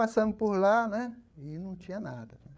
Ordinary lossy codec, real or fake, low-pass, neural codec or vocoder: none; fake; none; codec, 16 kHz, 8 kbps, FreqCodec, larger model